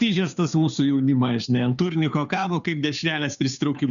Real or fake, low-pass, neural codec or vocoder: fake; 7.2 kHz; codec, 16 kHz, 2 kbps, FunCodec, trained on Chinese and English, 25 frames a second